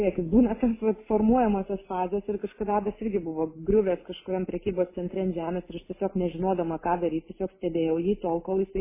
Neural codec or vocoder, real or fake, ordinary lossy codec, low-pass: none; real; MP3, 16 kbps; 3.6 kHz